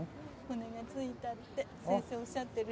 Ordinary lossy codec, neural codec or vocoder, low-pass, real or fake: none; none; none; real